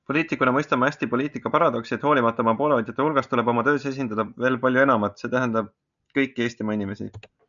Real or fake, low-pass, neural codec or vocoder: real; 7.2 kHz; none